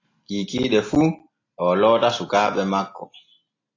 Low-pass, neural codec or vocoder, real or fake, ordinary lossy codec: 7.2 kHz; none; real; AAC, 32 kbps